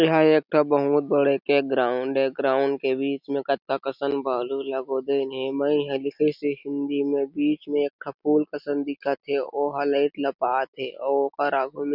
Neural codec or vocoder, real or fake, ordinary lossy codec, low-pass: none; real; none; 5.4 kHz